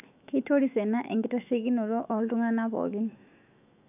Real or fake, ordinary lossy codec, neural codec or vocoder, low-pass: fake; none; autoencoder, 48 kHz, 128 numbers a frame, DAC-VAE, trained on Japanese speech; 3.6 kHz